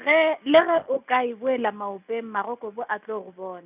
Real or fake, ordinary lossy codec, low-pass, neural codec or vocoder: real; none; 3.6 kHz; none